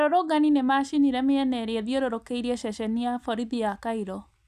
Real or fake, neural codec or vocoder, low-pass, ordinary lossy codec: real; none; 14.4 kHz; none